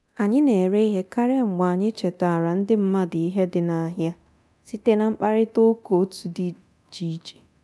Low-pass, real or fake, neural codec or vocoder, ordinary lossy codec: none; fake; codec, 24 kHz, 0.9 kbps, DualCodec; none